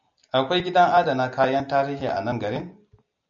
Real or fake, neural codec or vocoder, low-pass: real; none; 7.2 kHz